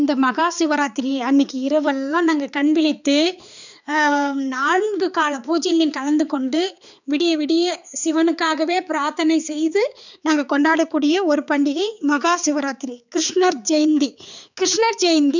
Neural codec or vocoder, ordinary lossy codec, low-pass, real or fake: codec, 16 kHz, 4 kbps, X-Codec, HuBERT features, trained on balanced general audio; none; 7.2 kHz; fake